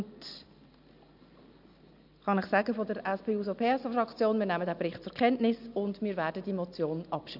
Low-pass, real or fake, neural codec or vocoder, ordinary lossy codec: 5.4 kHz; real; none; none